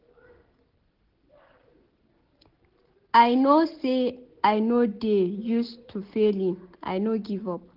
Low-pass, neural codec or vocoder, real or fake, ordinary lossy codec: 5.4 kHz; none; real; Opus, 16 kbps